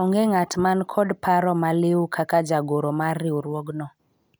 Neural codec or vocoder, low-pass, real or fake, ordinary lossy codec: none; none; real; none